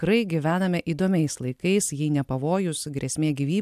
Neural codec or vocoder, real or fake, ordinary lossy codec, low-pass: none; real; AAC, 96 kbps; 14.4 kHz